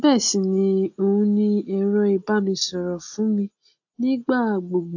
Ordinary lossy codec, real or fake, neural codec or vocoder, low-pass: AAC, 48 kbps; real; none; 7.2 kHz